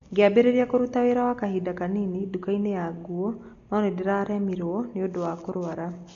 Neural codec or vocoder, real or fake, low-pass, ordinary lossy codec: none; real; 7.2 kHz; MP3, 48 kbps